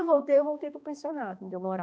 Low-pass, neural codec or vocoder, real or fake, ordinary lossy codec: none; codec, 16 kHz, 2 kbps, X-Codec, HuBERT features, trained on balanced general audio; fake; none